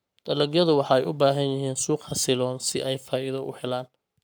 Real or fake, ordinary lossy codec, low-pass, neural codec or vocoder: fake; none; none; codec, 44.1 kHz, 7.8 kbps, Pupu-Codec